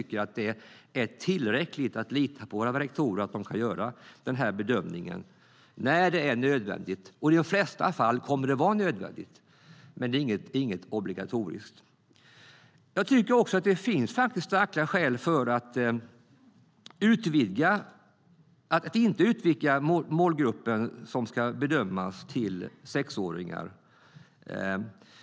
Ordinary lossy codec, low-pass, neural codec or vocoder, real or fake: none; none; none; real